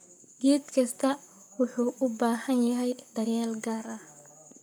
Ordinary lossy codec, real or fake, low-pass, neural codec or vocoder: none; fake; none; codec, 44.1 kHz, 7.8 kbps, Pupu-Codec